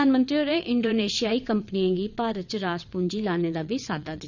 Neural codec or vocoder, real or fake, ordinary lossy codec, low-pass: vocoder, 22.05 kHz, 80 mel bands, WaveNeXt; fake; none; 7.2 kHz